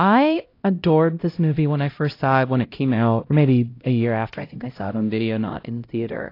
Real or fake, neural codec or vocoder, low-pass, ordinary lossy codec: fake; codec, 16 kHz, 0.5 kbps, X-Codec, HuBERT features, trained on LibriSpeech; 5.4 kHz; AAC, 32 kbps